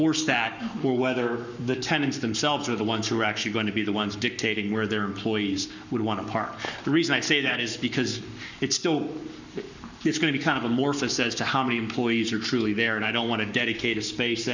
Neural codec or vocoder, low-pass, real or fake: codec, 44.1 kHz, 7.8 kbps, Pupu-Codec; 7.2 kHz; fake